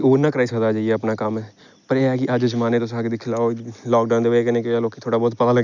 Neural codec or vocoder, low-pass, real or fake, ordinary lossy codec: none; 7.2 kHz; real; none